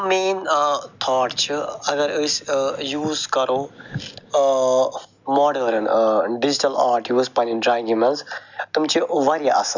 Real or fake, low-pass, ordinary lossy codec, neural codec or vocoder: real; 7.2 kHz; none; none